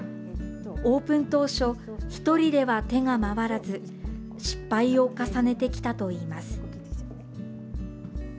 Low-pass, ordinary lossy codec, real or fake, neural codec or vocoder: none; none; real; none